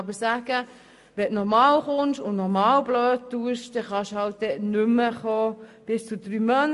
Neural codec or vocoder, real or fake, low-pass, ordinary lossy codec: none; real; 14.4 kHz; MP3, 48 kbps